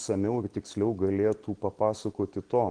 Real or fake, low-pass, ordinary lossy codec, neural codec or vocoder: real; 9.9 kHz; Opus, 16 kbps; none